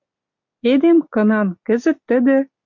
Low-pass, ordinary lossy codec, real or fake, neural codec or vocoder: 7.2 kHz; MP3, 48 kbps; real; none